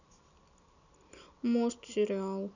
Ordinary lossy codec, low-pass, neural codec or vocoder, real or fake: none; 7.2 kHz; none; real